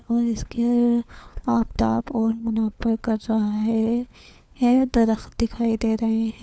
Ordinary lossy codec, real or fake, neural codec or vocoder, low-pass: none; fake; codec, 16 kHz, 4 kbps, FunCodec, trained on LibriTTS, 50 frames a second; none